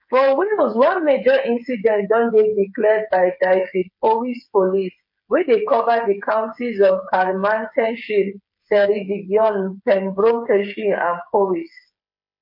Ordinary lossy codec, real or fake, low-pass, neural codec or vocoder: MP3, 32 kbps; fake; 5.4 kHz; codec, 16 kHz, 8 kbps, FreqCodec, smaller model